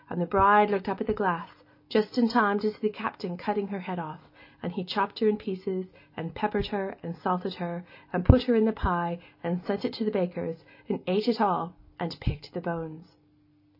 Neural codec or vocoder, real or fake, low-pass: none; real; 5.4 kHz